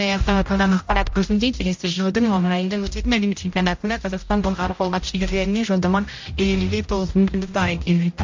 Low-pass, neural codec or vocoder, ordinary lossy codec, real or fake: 7.2 kHz; codec, 16 kHz, 0.5 kbps, X-Codec, HuBERT features, trained on general audio; MP3, 48 kbps; fake